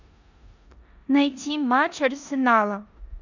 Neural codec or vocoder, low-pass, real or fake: codec, 16 kHz in and 24 kHz out, 0.9 kbps, LongCat-Audio-Codec, four codebook decoder; 7.2 kHz; fake